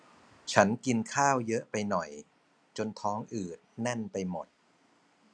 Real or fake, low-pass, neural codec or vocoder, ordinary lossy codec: real; none; none; none